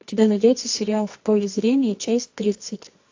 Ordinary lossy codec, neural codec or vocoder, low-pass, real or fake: AAC, 48 kbps; codec, 24 kHz, 0.9 kbps, WavTokenizer, medium music audio release; 7.2 kHz; fake